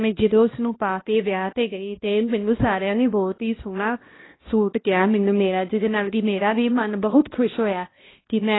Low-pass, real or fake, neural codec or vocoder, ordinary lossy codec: 7.2 kHz; fake; codec, 16 kHz, 1 kbps, X-Codec, HuBERT features, trained on balanced general audio; AAC, 16 kbps